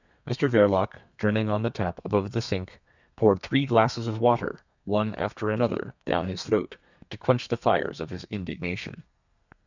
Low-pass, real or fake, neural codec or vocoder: 7.2 kHz; fake; codec, 32 kHz, 1.9 kbps, SNAC